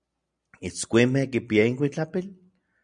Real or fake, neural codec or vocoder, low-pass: real; none; 9.9 kHz